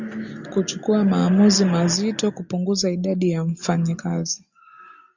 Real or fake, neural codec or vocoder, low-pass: real; none; 7.2 kHz